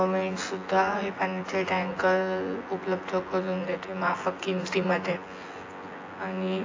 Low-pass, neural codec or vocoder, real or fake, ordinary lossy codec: 7.2 kHz; vocoder, 24 kHz, 100 mel bands, Vocos; fake; AAC, 32 kbps